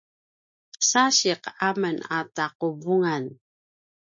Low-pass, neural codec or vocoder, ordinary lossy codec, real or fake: 7.2 kHz; none; MP3, 48 kbps; real